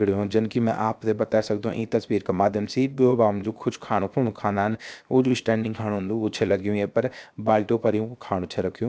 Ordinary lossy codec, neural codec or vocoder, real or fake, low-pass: none; codec, 16 kHz, 0.7 kbps, FocalCodec; fake; none